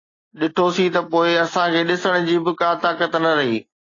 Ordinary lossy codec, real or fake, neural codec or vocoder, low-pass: AAC, 32 kbps; real; none; 7.2 kHz